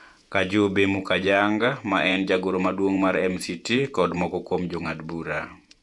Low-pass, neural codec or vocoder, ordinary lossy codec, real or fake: 10.8 kHz; vocoder, 48 kHz, 128 mel bands, Vocos; none; fake